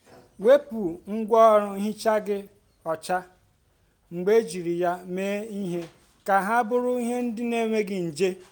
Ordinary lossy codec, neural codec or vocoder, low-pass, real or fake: none; none; none; real